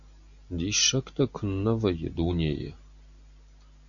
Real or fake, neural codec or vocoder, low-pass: real; none; 7.2 kHz